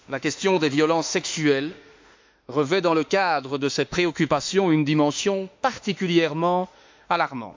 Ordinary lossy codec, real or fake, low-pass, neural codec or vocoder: MP3, 64 kbps; fake; 7.2 kHz; autoencoder, 48 kHz, 32 numbers a frame, DAC-VAE, trained on Japanese speech